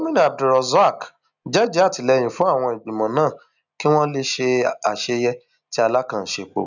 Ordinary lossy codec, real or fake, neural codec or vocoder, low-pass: none; real; none; 7.2 kHz